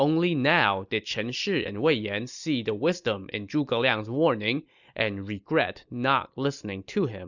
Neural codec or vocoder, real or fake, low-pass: none; real; 7.2 kHz